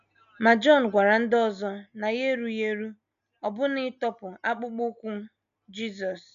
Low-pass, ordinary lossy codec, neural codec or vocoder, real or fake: 7.2 kHz; none; none; real